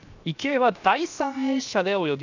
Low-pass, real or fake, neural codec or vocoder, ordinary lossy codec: 7.2 kHz; fake; codec, 16 kHz, 0.7 kbps, FocalCodec; none